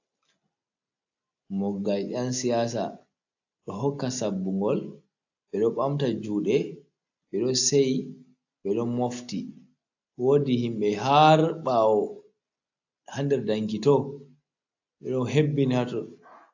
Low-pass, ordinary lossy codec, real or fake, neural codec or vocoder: 7.2 kHz; AAC, 48 kbps; real; none